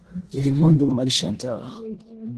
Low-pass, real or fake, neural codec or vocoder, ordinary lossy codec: 10.8 kHz; fake; codec, 16 kHz in and 24 kHz out, 0.9 kbps, LongCat-Audio-Codec, four codebook decoder; Opus, 16 kbps